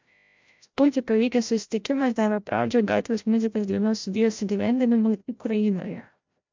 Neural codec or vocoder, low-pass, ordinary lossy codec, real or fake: codec, 16 kHz, 0.5 kbps, FreqCodec, larger model; 7.2 kHz; MP3, 64 kbps; fake